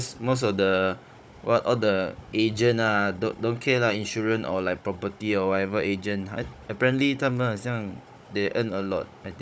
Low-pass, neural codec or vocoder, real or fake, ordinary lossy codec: none; codec, 16 kHz, 16 kbps, FunCodec, trained on Chinese and English, 50 frames a second; fake; none